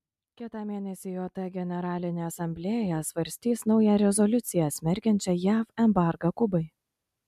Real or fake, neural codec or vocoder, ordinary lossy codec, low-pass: real; none; MP3, 96 kbps; 14.4 kHz